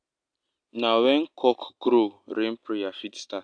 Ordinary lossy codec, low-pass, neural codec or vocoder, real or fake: AAC, 64 kbps; 9.9 kHz; none; real